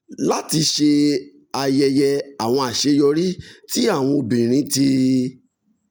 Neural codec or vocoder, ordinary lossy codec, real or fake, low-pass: none; none; real; none